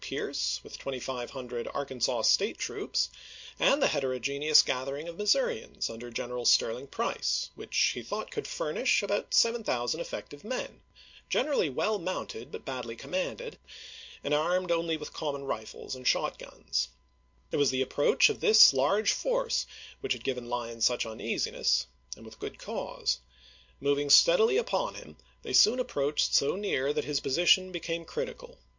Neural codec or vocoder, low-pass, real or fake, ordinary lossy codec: none; 7.2 kHz; real; MP3, 48 kbps